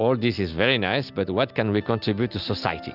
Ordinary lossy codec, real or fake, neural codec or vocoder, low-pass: Opus, 64 kbps; real; none; 5.4 kHz